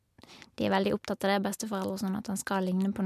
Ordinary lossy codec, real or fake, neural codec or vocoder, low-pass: MP3, 96 kbps; real; none; 14.4 kHz